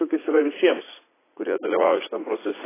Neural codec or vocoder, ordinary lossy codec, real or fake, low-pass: vocoder, 44.1 kHz, 80 mel bands, Vocos; AAC, 16 kbps; fake; 3.6 kHz